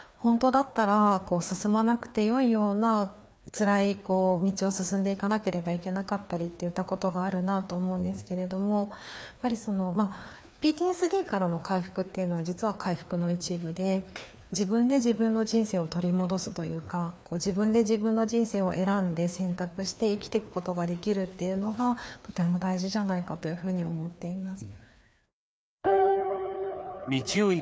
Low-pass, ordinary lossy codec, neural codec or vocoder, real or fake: none; none; codec, 16 kHz, 2 kbps, FreqCodec, larger model; fake